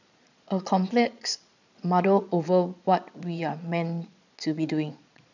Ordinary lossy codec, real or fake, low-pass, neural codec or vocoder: none; fake; 7.2 kHz; vocoder, 22.05 kHz, 80 mel bands, Vocos